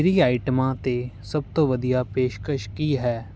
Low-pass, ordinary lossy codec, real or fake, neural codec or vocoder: none; none; real; none